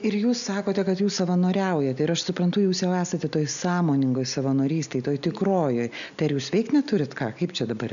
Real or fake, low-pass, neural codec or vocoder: real; 7.2 kHz; none